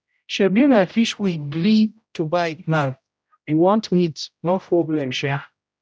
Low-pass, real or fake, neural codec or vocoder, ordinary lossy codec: none; fake; codec, 16 kHz, 0.5 kbps, X-Codec, HuBERT features, trained on general audio; none